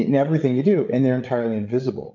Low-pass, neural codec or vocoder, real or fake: 7.2 kHz; codec, 16 kHz, 8 kbps, FreqCodec, larger model; fake